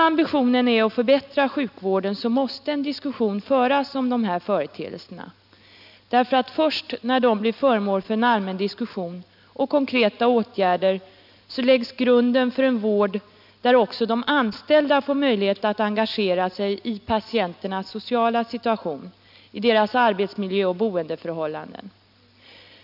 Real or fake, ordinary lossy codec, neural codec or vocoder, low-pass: real; none; none; 5.4 kHz